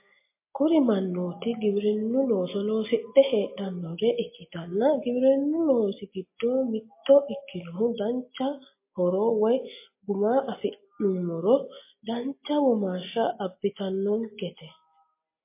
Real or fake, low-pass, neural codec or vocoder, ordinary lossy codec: real; 3.6 kHz; none; MP3, 24 kbps